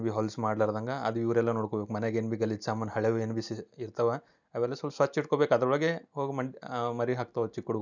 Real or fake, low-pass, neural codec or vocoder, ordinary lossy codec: real; 7.2 kHz; none; none